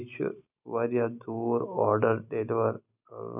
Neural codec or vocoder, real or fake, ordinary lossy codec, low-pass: none; real; none; 3.6 kHz